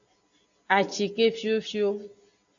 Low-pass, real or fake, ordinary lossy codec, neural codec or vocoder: 7.2 kHz; real; AAC, 64 kbps; none